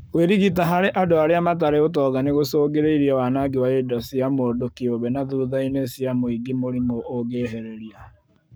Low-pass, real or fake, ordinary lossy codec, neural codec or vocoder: none; fake; none; codec, 44.1 kHz, 7.8 kbps, Pupu-Codec